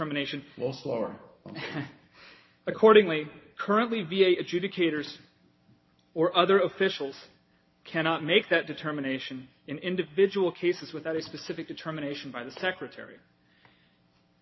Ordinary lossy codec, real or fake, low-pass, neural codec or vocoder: MP3, 24 kbps; real; 7.2 kHz; none